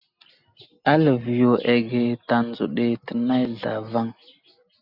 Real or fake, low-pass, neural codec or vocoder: real; 5.4 kHz; none